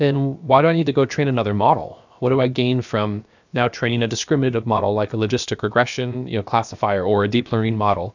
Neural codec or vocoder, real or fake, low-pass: codec, 16 kHz, about 1 kbps, DyCAST, with the encoder's durations; fake; 7.2 kHz